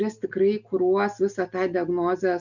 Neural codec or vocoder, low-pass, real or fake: none; 7.2 kHz; real